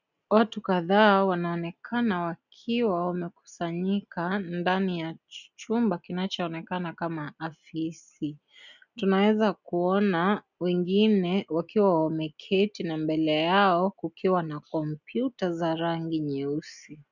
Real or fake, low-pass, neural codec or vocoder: real; 7.2 kHz; none